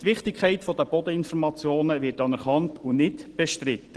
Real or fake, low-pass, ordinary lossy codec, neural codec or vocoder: real; 10.8 kHz; Opus, 16 kbps; none